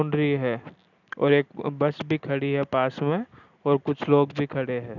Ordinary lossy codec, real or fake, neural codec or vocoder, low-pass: none; real; none; 7.2 kHz